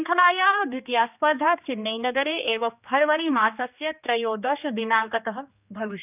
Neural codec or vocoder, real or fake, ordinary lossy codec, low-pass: codec, 16 kHz, 2 kbps, X-Codec, HuBERT features, trained on general audio; fake; none; 3.6 kHz